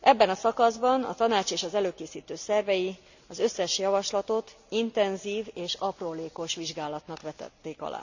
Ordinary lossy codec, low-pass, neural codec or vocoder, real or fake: none; 7.2 kHz; none; real